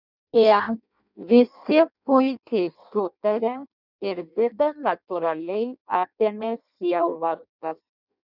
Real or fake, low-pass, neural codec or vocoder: fake; 5.4 kHz; codec, 16 kHz in and 24 kHz out, 0.6 kbps, FireRedTTS-2 codec